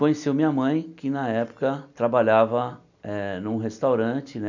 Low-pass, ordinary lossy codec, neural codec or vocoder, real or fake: 7.2 kHz; none; none; real